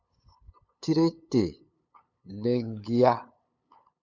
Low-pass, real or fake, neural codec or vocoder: 7.2 kHz; fake; codec, 16 kHz, 8 kbps, FunCodec, trained on LibriTTS, 25 frames a second